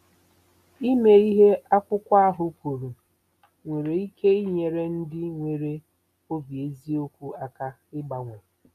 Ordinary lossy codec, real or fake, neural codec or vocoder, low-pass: none; real; none; 14.4 kHz